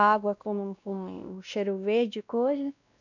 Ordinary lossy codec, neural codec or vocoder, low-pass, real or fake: none; codec, 16 kHz, 1 kbps, X-Codec, WavLM features, trained on Multilingual LibriSpeech; 7.2 kHz; fake